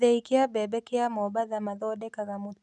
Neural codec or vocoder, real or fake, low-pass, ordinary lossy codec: none; real; 10.8 kHz; none